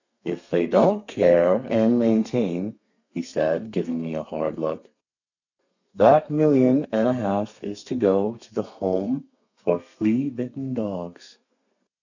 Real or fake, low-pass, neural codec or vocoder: fake; 7.2 kHz; codec, 32 kHz, 1.9 kbps, SNAC